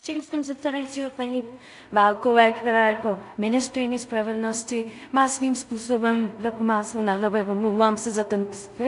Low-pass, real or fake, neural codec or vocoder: 10.8 kHz; fake; codec, 16 kHz in and 24 kHz out, 0.4 kbps, LongCat-Audio-Codec, two codebook decoder